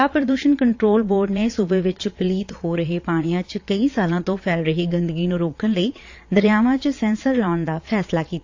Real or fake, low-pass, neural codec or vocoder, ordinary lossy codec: fake; 7.2 kHz; vocoder, 22.05 kHz, 80 mel bands, Vocos; AAC, 48 kbps